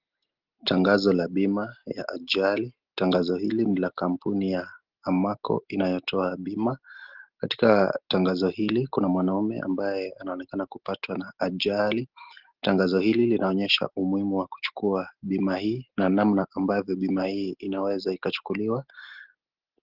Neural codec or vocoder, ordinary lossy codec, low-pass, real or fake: none; Opus, 16 kbps; 5.4 kHz; real